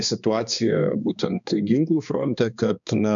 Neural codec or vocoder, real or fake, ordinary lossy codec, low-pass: codec, 16 kHz, 2 kbps, X-Codec, HuBERT features, trained on balanced general audio; fake; MP3, 96 kbps; 7.2 kHz